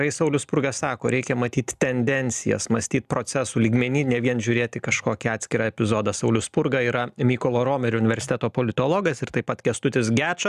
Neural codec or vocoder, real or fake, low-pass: none; real; 14.4 kHz